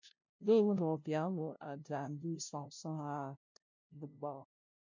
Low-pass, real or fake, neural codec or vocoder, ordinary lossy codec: 7.2 kHz; fake; codec, 16 kHz, 0.5 kbps, FunCodec, trained on LibriTTS, 25 frames a second; MP3, 48 kbps